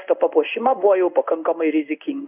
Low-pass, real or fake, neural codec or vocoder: 3.6 kHz; fake; codec, 16 kHz in and 24 kHz out, 1 kbps, XY-Tokenizer